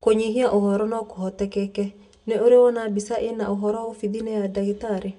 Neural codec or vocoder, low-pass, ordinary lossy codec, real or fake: none; 10.8 kHz; none; real